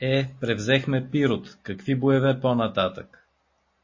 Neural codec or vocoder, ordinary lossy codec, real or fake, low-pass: none; MP3, 32 kbps; real; 7.2 kHz